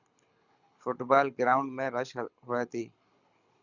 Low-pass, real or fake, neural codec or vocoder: 7.2 kHz; fake; codec, 24 kHz, 6 kbps, HILCodec